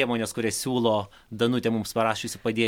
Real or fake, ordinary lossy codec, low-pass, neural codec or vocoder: real; MP3, 96 kbps; 19.8 kHz; none